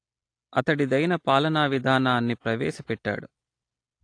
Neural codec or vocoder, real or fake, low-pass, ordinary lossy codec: none; real; 9.9 kHz; AAC, 48 kbps